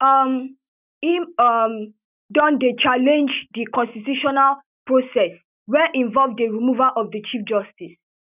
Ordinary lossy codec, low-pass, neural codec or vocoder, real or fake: none; 3.6 kHz; none; real